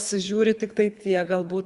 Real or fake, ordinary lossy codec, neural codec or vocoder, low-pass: fake; Opus, 64 kbps; codec, 24 kHz, 3 kbps, HILCodec; 10.8 kHz